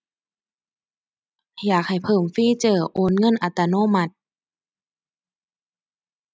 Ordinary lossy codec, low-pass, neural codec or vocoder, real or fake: none; none; none; real